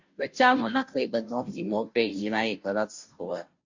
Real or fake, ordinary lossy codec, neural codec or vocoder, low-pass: fake; MP3, 48 kbps; codec, 16 kHz, 0.5 kbps, FunCodec, trained on Chinese and English, 25 frames a second; 7.2 kHz